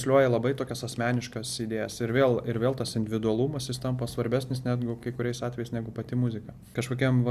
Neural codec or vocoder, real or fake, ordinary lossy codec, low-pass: none; real; Opus, 64 kbps; 14.4 kHz